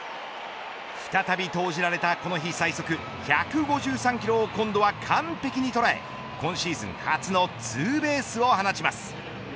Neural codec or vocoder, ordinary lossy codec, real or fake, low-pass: none; none; real; none